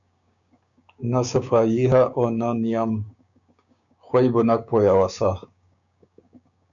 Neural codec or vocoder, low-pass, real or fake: codec, 16 kHz, 6 kbps, DAC; 7.2 kHz; fake